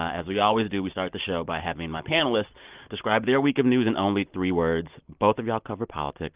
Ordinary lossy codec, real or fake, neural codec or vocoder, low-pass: Opus, 32 kbps; real; none; 3.6 kHz